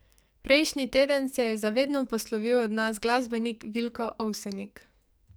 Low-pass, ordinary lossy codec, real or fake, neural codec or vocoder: none; none; fake; codec, 44.1 kHz, 2.6 kbps, SNAC